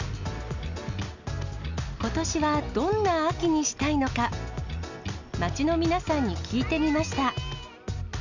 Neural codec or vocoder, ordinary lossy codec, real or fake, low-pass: none; none; real; 7.2 kHz